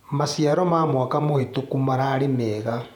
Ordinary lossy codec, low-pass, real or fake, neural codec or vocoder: MP3, 96 kbps; 19.8 kHz; fake; vocoder, 48 kHz, 128 mel bands, Vocos